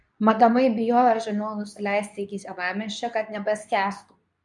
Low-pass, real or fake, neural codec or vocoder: 10.8 kHz; fake; codec, 24 kHz, 0.9 kbps, WavTokenizer, medium speech release version 2